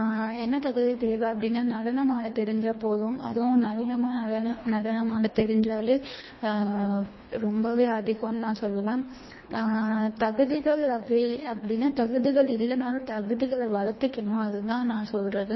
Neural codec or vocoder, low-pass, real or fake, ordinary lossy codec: codec, 24 kHz, 1.5 kbps, HILCodec; 7.2 kHz; fake; MP3, 24 kbps